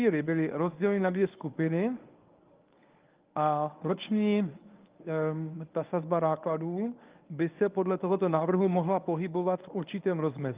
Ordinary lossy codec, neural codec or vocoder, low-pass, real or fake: Opus, 32 kbps; codec, 24 kHz, 0.9 kbps, WavTokenizer, medium speech release version 1; 3.6 kHz; fake